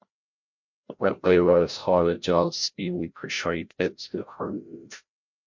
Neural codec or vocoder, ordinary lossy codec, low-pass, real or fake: codec, 16 kHz, 0.5 kbps, FreqCodec, larger model; MP3, 48 kbps; 7.2 kHz; fake